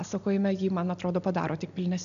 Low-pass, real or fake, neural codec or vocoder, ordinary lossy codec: 7.2 kHz; real; none; AAC, 96 kbps